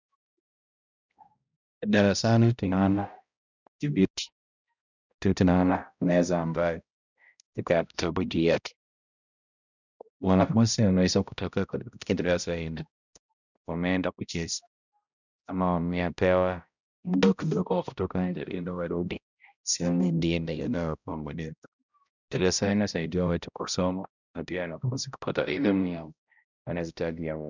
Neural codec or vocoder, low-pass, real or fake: codec, 16 kHz, 0.5 kbps, X-Codec, HuBERT features, trained on balanced general audio; 7.2 kHz; fake